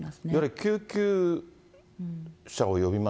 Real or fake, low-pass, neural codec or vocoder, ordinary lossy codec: real; none; none; none